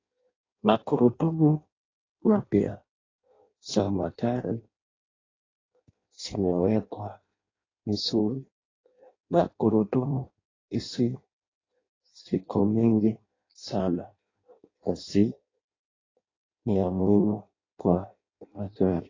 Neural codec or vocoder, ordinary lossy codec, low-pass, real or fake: codec, 16 kHz in and 24 kHz out, 0.6 kbps, FireRedTTS-2 codec; AAC, 32 kbps; 7.2 kHz; fake